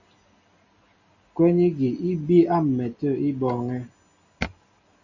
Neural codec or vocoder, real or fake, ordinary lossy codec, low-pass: none; real; Opus, 64 kbps; 7.2 kHz